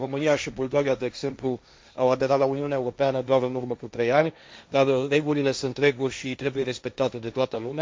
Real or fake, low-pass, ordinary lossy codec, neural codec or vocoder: fake; none; none; codec, 16 kHz, 1.1 kbps, Voila-Tokenizer